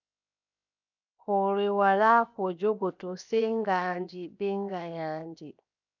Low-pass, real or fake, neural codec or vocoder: 7.2 kHz; fake; codec, 16 kHz, 0.7 kbps, FocalCodec